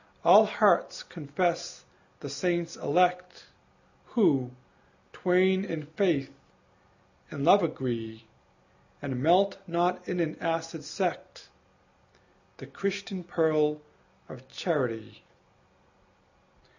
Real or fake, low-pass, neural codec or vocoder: real; 7.2 kHz; none